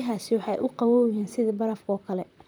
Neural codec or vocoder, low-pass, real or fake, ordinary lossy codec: vocoder, 44.1 kHz, 128 mel bands, Pupu-Vocoder; none; fake; none